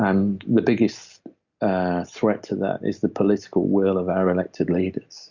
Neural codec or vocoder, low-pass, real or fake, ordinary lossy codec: none; 7.2 kHz; real; Opus, 64 kbps